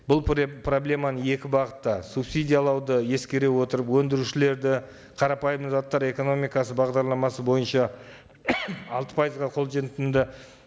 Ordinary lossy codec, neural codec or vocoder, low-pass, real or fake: none; none; none; real